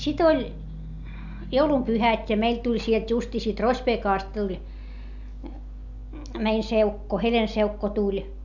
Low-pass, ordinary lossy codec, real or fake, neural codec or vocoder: 7.2 kHz; none; real; none